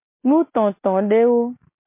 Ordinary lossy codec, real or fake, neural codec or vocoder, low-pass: MP3, 24 kbps; real; none; 3.6 kHz